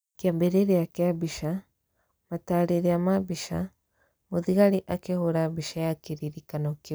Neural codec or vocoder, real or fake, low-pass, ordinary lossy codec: none; real; none; none